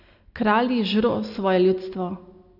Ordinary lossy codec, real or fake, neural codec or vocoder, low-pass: none; real; none; 5.4 kHz